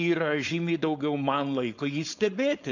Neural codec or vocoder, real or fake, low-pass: codec, 16 kHz, 4.8 kbps, FACodec; fake; 7.2 kHz